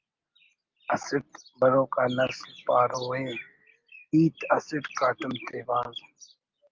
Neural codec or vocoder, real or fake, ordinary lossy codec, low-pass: none; real; Opus, 32 kbps; 7.2 kHz